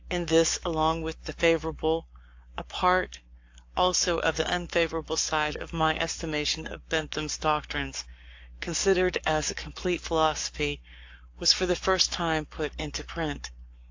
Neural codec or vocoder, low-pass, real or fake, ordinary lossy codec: codec, 44.1 kHz, 7.8 kbps, Pupu-Codec; 7.2 kHz; fake; AAC, 48 kbps